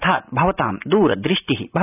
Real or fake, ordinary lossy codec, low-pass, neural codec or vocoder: real; none; 3.6 kHz; none